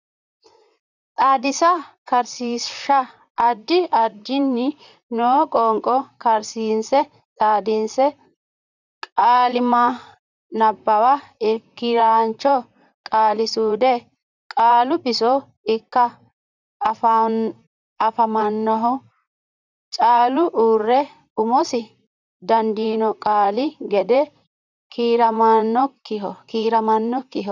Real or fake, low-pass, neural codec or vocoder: fake; 7.2 kHz; vocoder, 44.1 kHz, 128 mel bands, Pupu-Vocoder